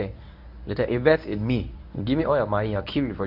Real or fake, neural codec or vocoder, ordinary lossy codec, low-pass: fake; codec, 24 kHz, 0.9 kbps, WavTokenizer, medium speech release version 1; none; 5.4 kHz